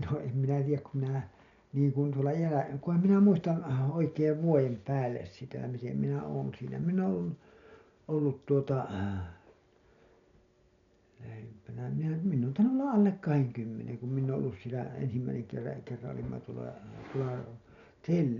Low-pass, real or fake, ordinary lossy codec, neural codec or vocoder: 7.2 kHz; real; none; none